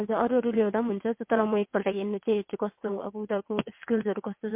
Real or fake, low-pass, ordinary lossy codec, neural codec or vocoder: fake; 3.6 kHz; MP3, 32 kbps; vocoder, 22.05 kHz, 80 mel bands, WaveNeXt